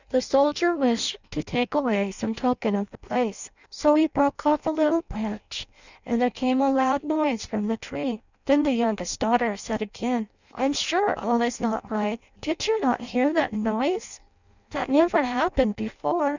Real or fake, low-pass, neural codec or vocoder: fake; 7.2 kHz; codec, 16 kHz in and 24 kHz out, 0.6 kbps, FireRedTTS-2 codec